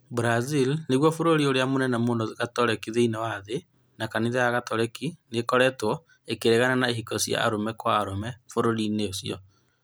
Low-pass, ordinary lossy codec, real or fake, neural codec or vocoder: none; none; real; none